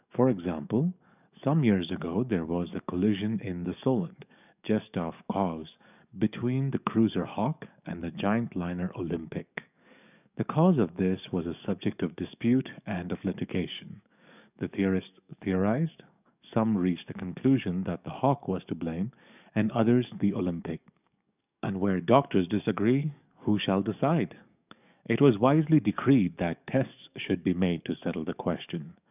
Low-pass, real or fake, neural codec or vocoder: 3.6 kHz; fake; codec, 44.1 kHz, 7.8 kbps, DAC